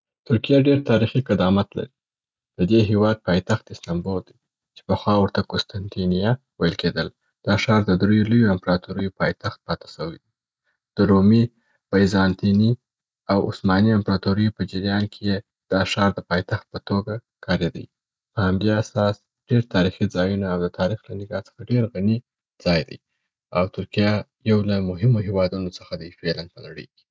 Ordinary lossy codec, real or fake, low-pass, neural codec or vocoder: none; real; none; none